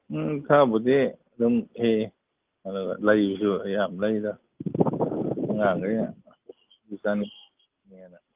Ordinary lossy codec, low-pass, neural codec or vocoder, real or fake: Opus, 24 kbps; 3.6 kHz; none; real